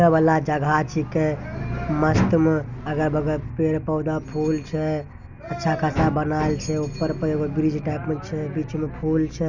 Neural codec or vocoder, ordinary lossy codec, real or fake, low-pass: none; none; real; 7.2 kHz